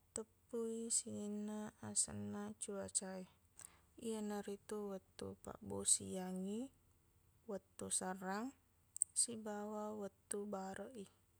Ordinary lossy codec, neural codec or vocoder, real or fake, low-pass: none; none; real; none